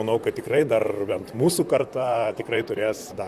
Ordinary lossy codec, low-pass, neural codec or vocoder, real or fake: Opus, 64 kbps; 14.4 kHz; vocoder, 44.1 kHz, 128 mel bands, Pupu-Vocoder; fake